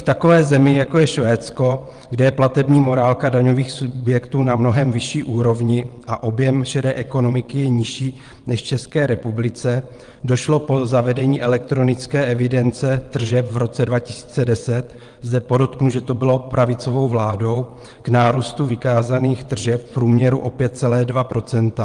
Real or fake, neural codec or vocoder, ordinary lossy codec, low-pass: fake; vocoder, 22.05 kHz, 80 mel bands, WaveNeXt; Opus, 24 kbps; 9.9 kHz